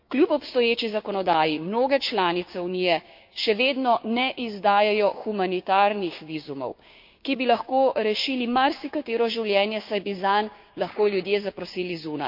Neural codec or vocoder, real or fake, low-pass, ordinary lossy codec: codec, 16 kHz, 6 kbps, DAC; fake; 5.4 kHz; MP3, 48 kbps